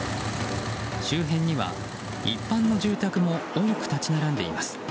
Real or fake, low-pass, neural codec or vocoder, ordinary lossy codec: real; none; none; none